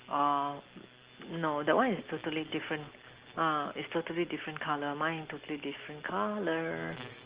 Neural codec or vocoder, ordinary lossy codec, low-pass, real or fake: none; Opus, 16 kbps; 3.6 kHz; real